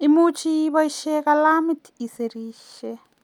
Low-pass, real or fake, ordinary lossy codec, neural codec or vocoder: 19.8 kHz; real; none; none